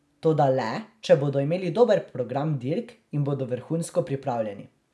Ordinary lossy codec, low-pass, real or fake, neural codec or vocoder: none; none; real; none